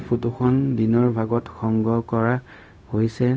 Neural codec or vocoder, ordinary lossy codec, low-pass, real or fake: codec, 16 kHz, 0.4 kbps, LongCat-Audio-Codec; none; none; fake